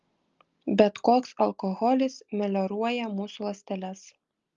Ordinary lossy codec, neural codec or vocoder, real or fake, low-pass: Opus, 32 kbps; none; real; 7.2 kHz